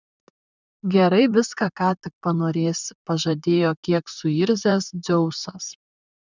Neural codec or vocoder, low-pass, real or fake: vocoder, 44.1 kHz, 128 mel bands, Pupu-Vocoder; 7.2 kHz; fake